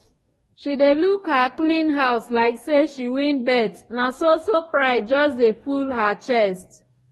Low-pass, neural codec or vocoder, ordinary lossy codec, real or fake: 19.8 kHz; codec, 44.1 kHz, 2.6 kbps, DAC; AAC, 32 kbps; fake